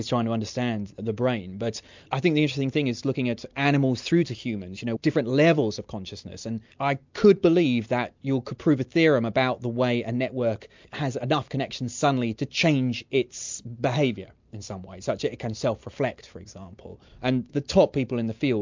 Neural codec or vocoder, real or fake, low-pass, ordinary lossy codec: none; real; 7.2 kHz; MP3, 64 kbps